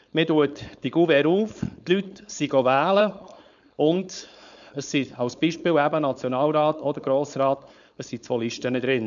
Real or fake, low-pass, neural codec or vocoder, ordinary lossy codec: fake; 7.2 kHz; codec, 16 kHz, 4.8 kbps, FACodec; none